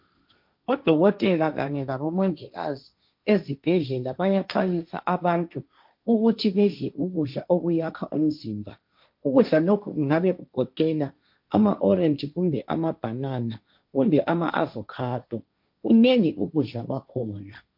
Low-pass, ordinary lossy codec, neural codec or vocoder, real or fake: 5.4 kHz; MP3, 48 kbps; codec, 16 kHz, 1.1 kbps, Voila-Tokenizer; fake